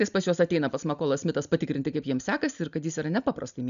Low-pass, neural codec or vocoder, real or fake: 7.2 kHz; none; real